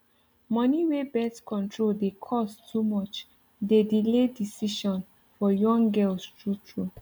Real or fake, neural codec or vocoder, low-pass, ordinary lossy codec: real; none; none; none